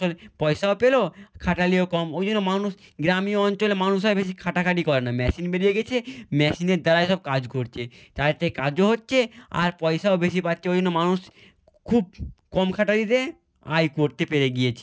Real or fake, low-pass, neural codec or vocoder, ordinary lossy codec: real; none; none; none